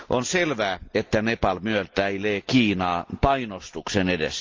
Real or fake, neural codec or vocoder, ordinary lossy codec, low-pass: real; none; Opus, 32 kbps; 7.2 kHz